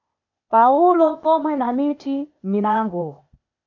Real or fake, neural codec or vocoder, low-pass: fake; codec, 16 kHz, 0.8 kbps, ZipCodec; 7.2 kHz